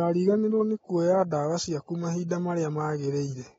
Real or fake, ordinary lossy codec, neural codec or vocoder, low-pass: real; AAC, 24 kbps; none; 19.8 kHz